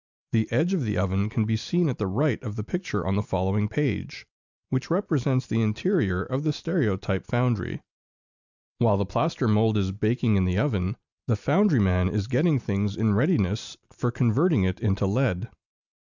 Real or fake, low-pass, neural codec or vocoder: real; 7.2 kHz; none